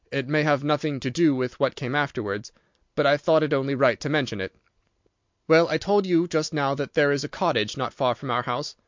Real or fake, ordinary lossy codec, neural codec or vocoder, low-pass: real; MP3, 64 kbps; none; 7.2 kHz